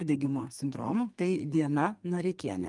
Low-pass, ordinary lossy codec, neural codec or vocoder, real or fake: 10.8 kHz; Opus, 32 kbps; codec, 32 kHz, 1.9 kbps, SNAC; fake